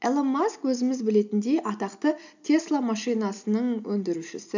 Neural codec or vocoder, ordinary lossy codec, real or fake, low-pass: none; none; real; 7.2 kHz